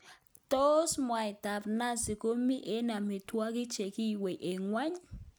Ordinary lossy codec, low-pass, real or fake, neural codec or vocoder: none; none; real; none